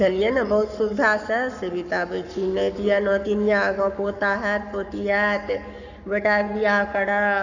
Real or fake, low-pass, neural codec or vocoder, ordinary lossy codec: fake; 7.2 kHz; codec, 44.1 kHz, 7.8 kbps, Pupu-Codec; none